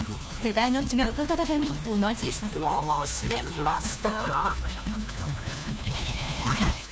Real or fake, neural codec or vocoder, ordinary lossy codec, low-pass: fake; codec, 16 kHz, 1 kbps, FunCodec, trained on LibriTTS, 50 frames a second; none; none